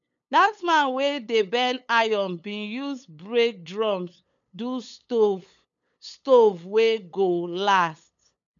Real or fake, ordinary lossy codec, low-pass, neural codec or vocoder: fake; none; 7.2 kHz; codec, 16 kHz, 8 kbps, FunCodec, trained on LibriTTS, 25 frames a second